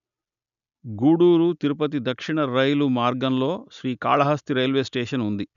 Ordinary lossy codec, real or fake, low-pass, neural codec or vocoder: none; real; 7.2 kHz; none